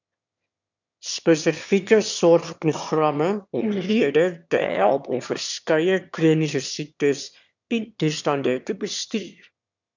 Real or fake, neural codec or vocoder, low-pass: fake; autoencoder, 22.05 kHz, a latent of 192 numbers a frame, VITS, trained on one speaker; 7.2 kHz